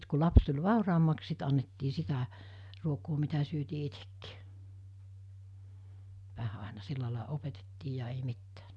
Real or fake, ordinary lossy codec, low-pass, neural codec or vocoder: real; none; none; none